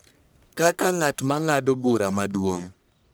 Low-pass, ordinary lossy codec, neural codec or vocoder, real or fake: none; none; codec, 44.1 kHz, 1.7 kbps, Pupu-Codec; fake